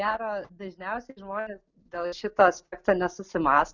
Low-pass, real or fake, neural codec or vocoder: 7.2 kHz; real; none